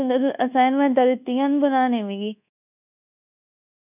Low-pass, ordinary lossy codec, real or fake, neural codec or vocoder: 3.6 kHz; none; fake; codec, 24 kHz, 1.2 kbps, DualCodec